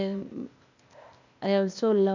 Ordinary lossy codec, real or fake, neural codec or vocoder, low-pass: none; fake; codec, 16 kHz, 0.8 kbps, ZipCodec; 7.2 kHz